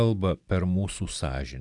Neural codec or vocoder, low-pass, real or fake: none; 10.8 kHz; real